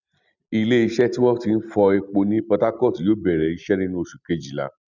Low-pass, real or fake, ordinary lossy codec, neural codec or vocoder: 7.2 kHz; real; none; none